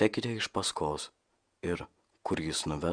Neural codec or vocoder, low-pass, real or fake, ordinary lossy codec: vocoder, 48 kHz, 128 mel bands, Vocos; 9.9 kHz; fake; Opus, 64 kbps